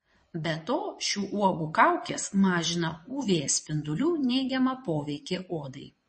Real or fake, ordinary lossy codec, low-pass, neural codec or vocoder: fake; MP3, 32 kbps; 9.9 kHz; vocoder, 22.05 kHz, 80 mel bands, WaveNeXt